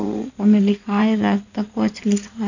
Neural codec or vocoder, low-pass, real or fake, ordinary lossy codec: codec, 16 kHz in and 24 kHz out, 2.2 kbps, FireRedTTS-2 codec; 7.2 kHz; fake; none